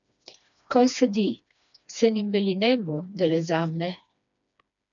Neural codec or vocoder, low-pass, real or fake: codec, 16 kHz, 2 kbps, FreqCodec, smaller model; 7.2 kHz; fake